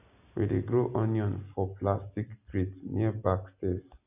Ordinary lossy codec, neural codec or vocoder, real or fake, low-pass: none; none; real; 3.6 kHz